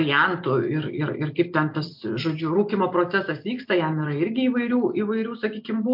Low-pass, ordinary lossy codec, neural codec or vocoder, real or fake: 5.4 kHz; MP3, 48 kbps; none; real